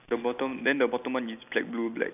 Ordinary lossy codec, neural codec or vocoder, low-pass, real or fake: none; none; 3.6 kHz; real